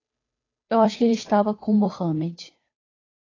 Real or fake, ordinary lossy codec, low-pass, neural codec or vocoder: fake; AAC, 32 kbps; 7.2 kHz; codec, 16 kHz, 2 kbps, FunCodec, trained on Chinese and English, 25 frames a second